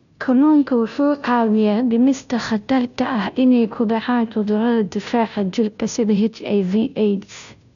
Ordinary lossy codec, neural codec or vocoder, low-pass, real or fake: none; codec, 16 kHz, 0.5 kbps, FunCodec, trained on Chinese and English, 25 frames a second; 7.2 kHz; fake